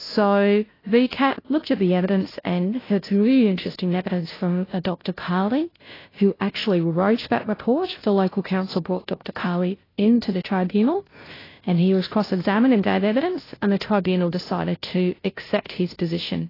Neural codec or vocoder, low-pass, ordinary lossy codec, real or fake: codec, 16 kHz, 0.5 kbps, FunCodec, trained on Chinese and English, 25 frames a second; 5.4 kHz; AAC, 24 kbps; fake